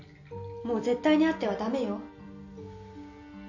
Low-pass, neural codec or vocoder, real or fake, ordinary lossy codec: 7.2 kHz; none; real; none